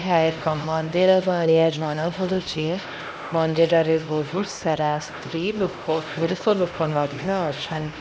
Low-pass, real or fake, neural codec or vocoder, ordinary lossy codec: none; fake; codec, 16 kHz, 1 kbps, X-Codec, HuBERT features, trained on LibriSpeech; none